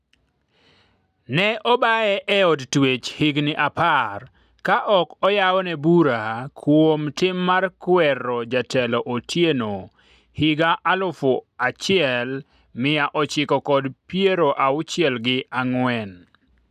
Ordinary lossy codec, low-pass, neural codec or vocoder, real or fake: none; 14.4 kHz; none; real